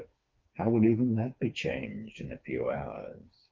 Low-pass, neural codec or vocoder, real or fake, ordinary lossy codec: 7.2 kHz; codec, 16 kHz, 4 kbps, FreqCodec, smaller model; fake; Opus, 24 kbps